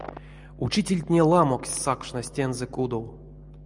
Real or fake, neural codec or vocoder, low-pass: real; none; 10.8 kHz